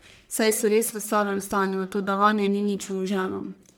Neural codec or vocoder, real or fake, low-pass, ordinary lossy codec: codec, 44.1 kHz, 1.7 kbps, Pupu-Codec; fake; none; none